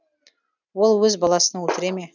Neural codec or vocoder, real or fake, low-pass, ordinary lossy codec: none; real; 7.2 kHz; none